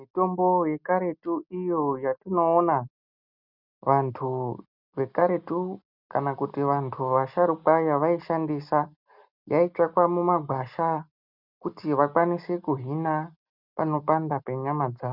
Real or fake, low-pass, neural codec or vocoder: real; 5.4 kHz; none